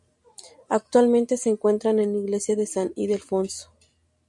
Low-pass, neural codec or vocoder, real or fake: 10.8 kHz; none; real